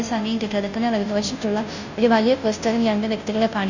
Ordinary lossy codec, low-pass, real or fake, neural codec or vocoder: none; 7.2 kHz; fake; codec, 16 kHz, 0.5 kbps, FunCodec, trained on Chinese and English, 25 frames a second